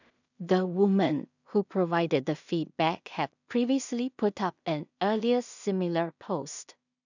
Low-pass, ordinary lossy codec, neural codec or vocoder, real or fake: 7.2 kHz; none; codec, 16 kHz in and 24 kHz out, 0.4 kbps, LongCat-Audio-Codec, two codebook decoder; fake